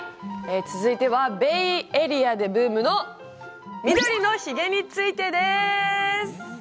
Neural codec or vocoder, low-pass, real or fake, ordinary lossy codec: none; none; real; none